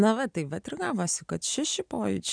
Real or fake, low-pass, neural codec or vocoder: real; 9.9 kHz; none